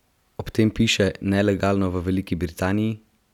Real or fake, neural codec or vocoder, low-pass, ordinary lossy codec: real; none; 19.8 kHz; none